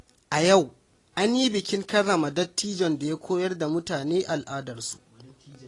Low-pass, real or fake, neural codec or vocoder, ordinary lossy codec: 10.8 kHz; real; none; AAC, 32 kbps